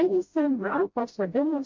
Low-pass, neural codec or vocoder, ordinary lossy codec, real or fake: 7.2 kHz; codec, 16 kHz, 0.5 kbps, FreqCodec, smaller model; MP3, 48 kbps; fake